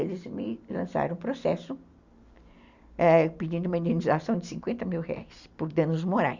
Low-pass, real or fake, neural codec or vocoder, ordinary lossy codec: 7.2 kHz; real; none; Opus, 64 kbps